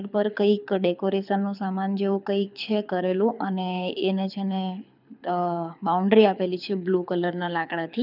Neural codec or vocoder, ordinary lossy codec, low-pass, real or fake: codec, 24 kHz, 6 kbps, HILCodec; none; 5.4 kHz; fake